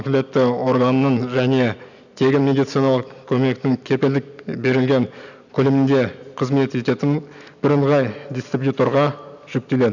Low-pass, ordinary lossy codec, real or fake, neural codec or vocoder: 7.2 kHz; none; real; none